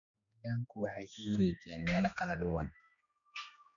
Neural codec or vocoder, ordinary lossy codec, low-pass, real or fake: codec, 16 kHz, 2 kbps, X-Codec, HuBERT features, trained on general audio; none; 7.2 kHz; fake